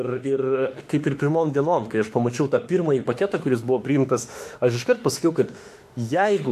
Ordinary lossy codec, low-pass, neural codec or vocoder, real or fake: AAC, 64 kbps; 14.4 kHz; autoencoder, 48 kHz, 32 numbers a frame, DAC-VAE, trained on Japanese speech; fake